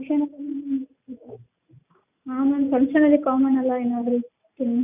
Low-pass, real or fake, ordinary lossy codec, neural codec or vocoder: 3.6 kHz; real; none; none